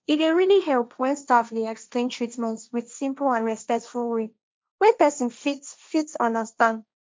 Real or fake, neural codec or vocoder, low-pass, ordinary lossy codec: fake; codec, 16 kHz, 1.1 kbps, Voila-Tokenizer; none; none